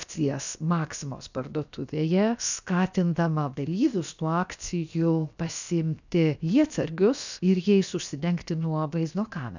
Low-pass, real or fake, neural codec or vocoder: 7.2 kHz; fake; codec, 16 kHz, about 1 kbps, DyCAST, with the encoder's durations